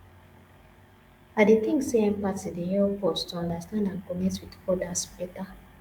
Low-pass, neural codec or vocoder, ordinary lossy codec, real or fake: 19.8 kHz; codec, 44.1 kHz, 7.8 kbps, DAC; Opus, 64 kbps; fake